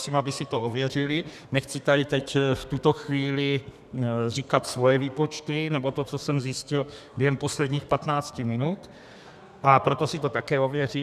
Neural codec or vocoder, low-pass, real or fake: codec, 32 kHz, 1.9 kbps, SNAC; 14.4 kHz; fake